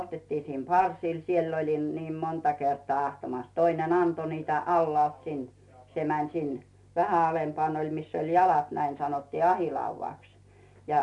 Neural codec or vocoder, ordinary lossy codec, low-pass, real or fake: none; MP3, 64 kbps; 10.8 kHz; real